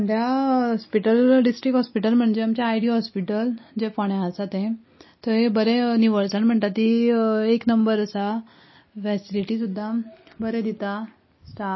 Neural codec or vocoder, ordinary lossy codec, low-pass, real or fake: none; MP3, 24 kbps; 7.2 kHz; real